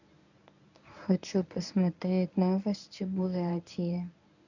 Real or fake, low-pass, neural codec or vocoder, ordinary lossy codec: fake; 7.2 kHz; codec, 24 kHz, 0.9 kbps, WavTokenizer, medium speech release version 1; none